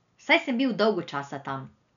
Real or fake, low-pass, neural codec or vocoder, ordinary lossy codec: real; 7.2 kHz; none; none